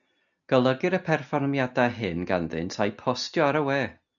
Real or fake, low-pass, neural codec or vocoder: real; 7.2 kHz; none